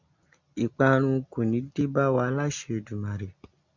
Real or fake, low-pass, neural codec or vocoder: real; 7.2 kHz; none